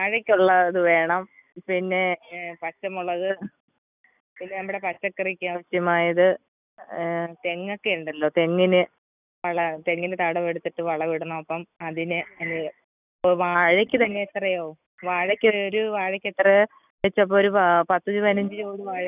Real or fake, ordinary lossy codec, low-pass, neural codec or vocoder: real; none; 3.6 kHz; none